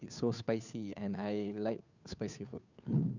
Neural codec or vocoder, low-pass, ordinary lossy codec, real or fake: codec, 16 kHz, 2 kbps, FunCodec, trained on Chinese and English, 25 frames a second; 7.2 kHz; none; fake